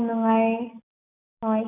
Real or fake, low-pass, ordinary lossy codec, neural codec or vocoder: real; 3.6 kHz; none; none